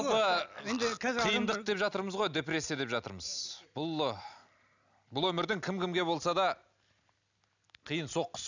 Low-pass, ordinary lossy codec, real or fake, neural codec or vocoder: 7.2 kHz; none; real; none